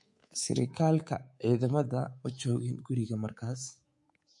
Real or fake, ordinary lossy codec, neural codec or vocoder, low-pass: fake; MP3, 48 kbps; codec, 24 kHz, 3.1 kbps, DualCodec; 10.8 kHz